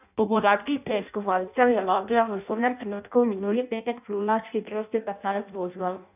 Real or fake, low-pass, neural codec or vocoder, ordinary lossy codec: fake; 3.6 kHz; codec, 16 kHz in and 24 kHz out, 0.6 kbps, FireRedTTS-2 codec; none